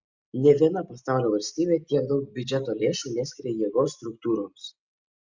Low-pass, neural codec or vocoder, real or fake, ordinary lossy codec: 7.2 kHz; none; real; Opus, 64 kbps